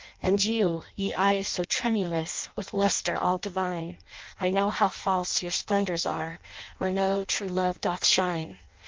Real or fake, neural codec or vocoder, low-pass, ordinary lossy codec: fake; codec, 16 kHz in and 24 kHz out, 0.6 kbps, FireRedTTS-2 codec; 7.2 kHz; Opus, 32 kbps